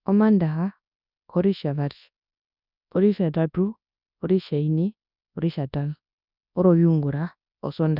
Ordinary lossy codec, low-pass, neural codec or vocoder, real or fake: none; 5.4 kHz; codec, 24 kHz, 0.9 kbps, WavTokenizer, large speech release; fake